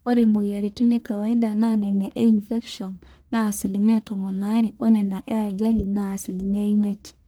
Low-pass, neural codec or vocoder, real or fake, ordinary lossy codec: none; codec, 44.1 kHz, 1.7 kbps, Pupu-Codec; fake; none